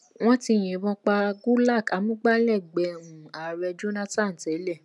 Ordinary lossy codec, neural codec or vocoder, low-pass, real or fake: none; none; 10.8 kHz; real